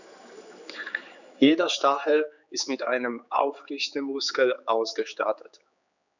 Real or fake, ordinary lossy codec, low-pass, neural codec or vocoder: fake; none; 7.2 kHz; codec, 16 kHz, 4 kbps, X-Codec, HuBERT features, trained on general audio